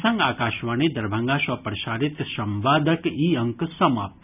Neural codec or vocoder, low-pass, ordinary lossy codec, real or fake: none; 3.6 kHz; none; real